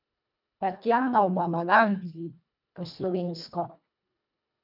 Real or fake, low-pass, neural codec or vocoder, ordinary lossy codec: fake; 5.4 kHz; codec, 24 kHz, 1.5 kbps, HILCodec; none